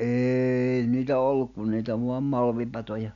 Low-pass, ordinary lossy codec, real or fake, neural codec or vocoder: 7.2 kHz; none; real; none